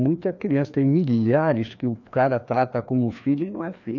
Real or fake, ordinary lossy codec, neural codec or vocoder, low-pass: fake; none; codec, 16 kHz, 2 kbps, FreqCodec, larger model; 7.2 kHz